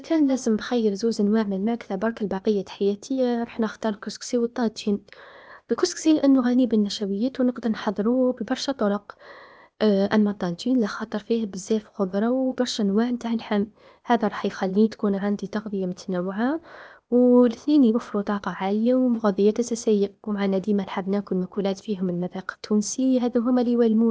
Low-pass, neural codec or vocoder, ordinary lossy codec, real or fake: none; codec, 16 kHz, 0.8 kbps, ZipCodec; none; fake